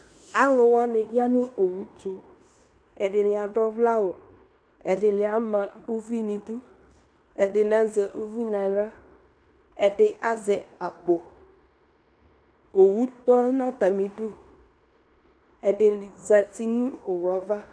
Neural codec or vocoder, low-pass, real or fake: codec, 16 kHz in and 24 kHz out, 0.9 kbps, LongCat-Audio-Codec, fine tuned four codebook decoder; 9.9 kHz; fake